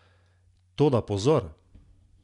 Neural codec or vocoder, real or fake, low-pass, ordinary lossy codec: none; real; 10.8 kHz; none